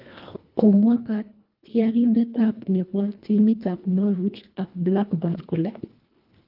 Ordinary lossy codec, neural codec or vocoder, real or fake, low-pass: Opus, 24 kbps; codec, 24 kHz, 1.5 kbps, HILCodec; fake; 5.4 kHz